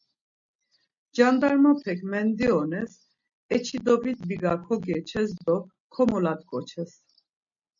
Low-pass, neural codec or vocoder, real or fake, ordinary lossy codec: 7.2 kHz; none; real; MP3, 96 kbps